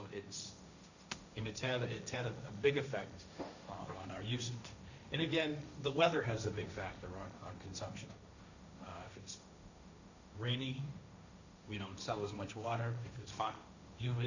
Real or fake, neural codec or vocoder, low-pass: fake; codec, 16 kHz, 1.1 kbps, Voila-Tokenizer; 7.2 kHz